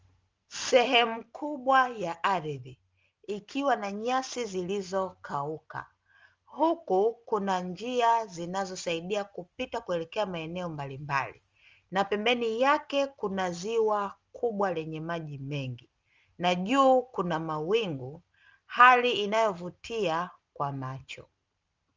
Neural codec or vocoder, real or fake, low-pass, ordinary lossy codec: none; real; 7.2 kHz; Opus, 32 kbps